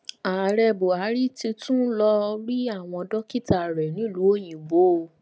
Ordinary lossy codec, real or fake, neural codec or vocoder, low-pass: none; real; none; none